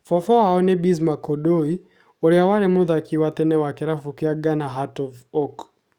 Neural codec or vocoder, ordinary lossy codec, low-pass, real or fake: codec, 44.1 kHz, 7.8 kbps, DAC; Opus, 64 kbps; 19.8 kHz; fake